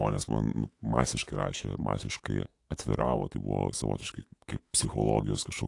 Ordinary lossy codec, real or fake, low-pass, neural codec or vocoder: AAC, 48 kbps; fake; 10.8 kHz; autoencoder, 48 kHz, 128 numbers a frame, DAC-VAE, trained on Japanese speech